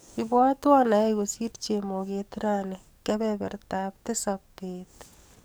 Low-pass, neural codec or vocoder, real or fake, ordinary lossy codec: none; codec, 44.1 kHz, 7.8 kbps, DAC; fake; none